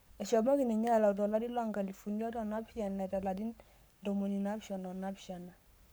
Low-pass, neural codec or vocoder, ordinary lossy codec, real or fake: none; codec, 44.1 kHz, 7.8 kbps, Pupu-Codec; none; fake